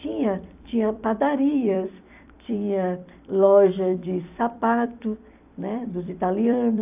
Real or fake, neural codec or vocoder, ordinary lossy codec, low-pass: fake; vocoder, 44.1 kHz, 128 mel bands every 256 samples, BigVGAN v2; AAC, 32 kbps; 3.6 kHz